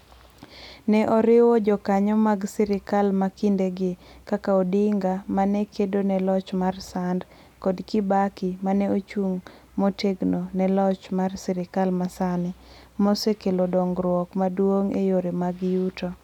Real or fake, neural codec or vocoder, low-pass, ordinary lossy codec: real; none; 19.8 kHz; none